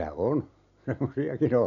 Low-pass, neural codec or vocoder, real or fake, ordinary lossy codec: 7.2 kHz; none; real; MP3, 96 kbps